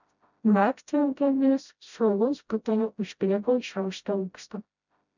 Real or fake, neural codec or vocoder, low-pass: fake; codec, 16 kHz, 0.5 kbps, FreqCodec, smaller model; 7.2 kHz